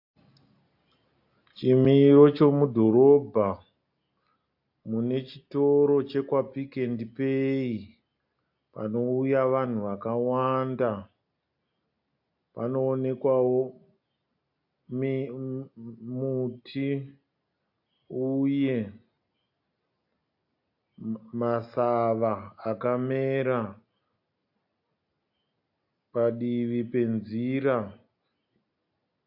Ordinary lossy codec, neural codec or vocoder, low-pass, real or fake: AAC, 48 kbps; none; 5.4 kHz; real